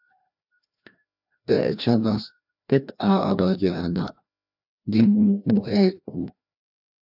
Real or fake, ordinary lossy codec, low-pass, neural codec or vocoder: fake; AAC, 48 kbps; 5.4 kHz; codec, 16 kHz, 1 kbps, FreqCodec, larger model